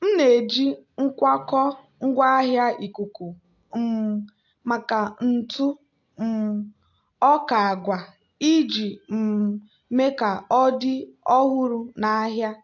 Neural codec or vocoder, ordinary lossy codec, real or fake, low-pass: none; AAC, 48 kbps; real; 7.2 kHz